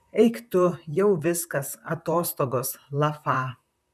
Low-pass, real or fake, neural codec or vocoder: 14.4 kHz; fake; vocoder, 44.1 kHz, 128 mel bands, Pupu-Vocoder